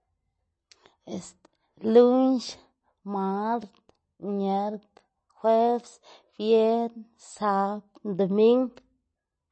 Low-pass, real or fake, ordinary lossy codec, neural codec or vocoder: 9.9 kHz; real; MP3, 32 kbps; none